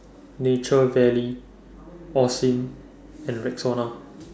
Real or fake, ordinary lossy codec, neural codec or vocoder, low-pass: real; none; none; none